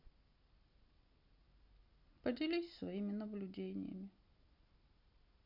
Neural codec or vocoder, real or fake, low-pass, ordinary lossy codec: none; real; 5.4 kHz; none